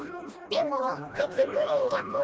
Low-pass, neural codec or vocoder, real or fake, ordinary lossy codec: none; codec, 16 kHz, 1 kbps, FreqCodec, smaller model; fake; none